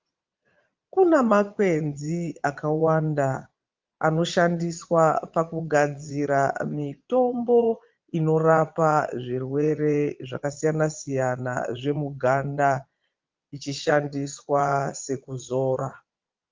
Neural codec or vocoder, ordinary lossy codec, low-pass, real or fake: vocoder, 22.05 kHz, 80 mel bands, Vocos; Opus, 32 kbps; 7.2 kHz; fake